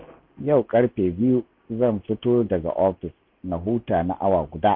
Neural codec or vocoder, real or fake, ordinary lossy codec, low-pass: none; real; MP3, 48 kbps; 5.4 kHz